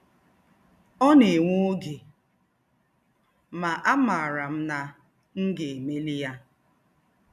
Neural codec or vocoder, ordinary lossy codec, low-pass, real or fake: none; none; 14.4 kHz; real